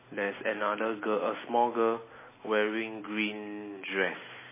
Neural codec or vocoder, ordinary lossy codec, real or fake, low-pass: none; MP3, 16 kbps; real; 3.6 kHz